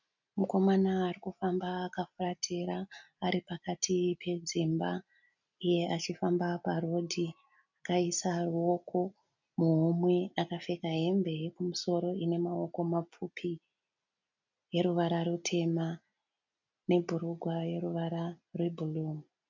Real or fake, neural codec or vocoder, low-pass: real; none; 7.2 kHz